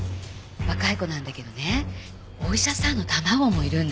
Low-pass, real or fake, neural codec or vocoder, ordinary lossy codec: none; real; none; none